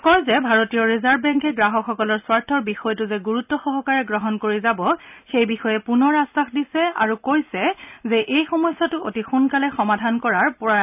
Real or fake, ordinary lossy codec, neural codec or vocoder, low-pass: real; none; none; 3.6 kHz